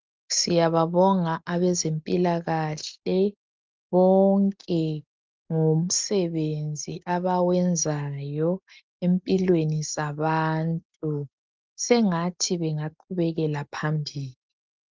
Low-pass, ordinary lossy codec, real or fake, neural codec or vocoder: 7.2 kHz; Opus, 32 kbps; real; none